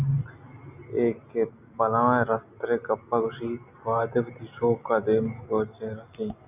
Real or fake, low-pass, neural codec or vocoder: real; 3.6 kHz; none